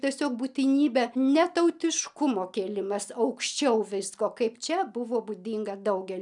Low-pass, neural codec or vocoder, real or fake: 10.8 kHz; none; real